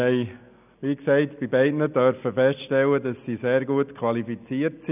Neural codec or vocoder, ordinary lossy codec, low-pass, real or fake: none; none; 3.6 kHz; real